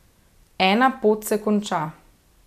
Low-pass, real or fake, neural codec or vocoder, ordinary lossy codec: 14.4 kHz; real; none; none